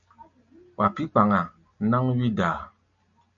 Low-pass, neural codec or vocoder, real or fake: 7.2 kHz; none; real